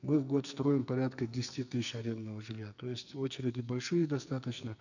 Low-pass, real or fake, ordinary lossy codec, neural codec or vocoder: 7.2 kHz; fake; none; codec, 44.1 kHz, 2.6 kbps, SNAC